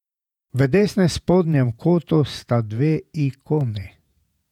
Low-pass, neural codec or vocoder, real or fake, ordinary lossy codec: 19.8 kHz; vocoder, 48 kHz, 128 mel bands, Vocos; fake; none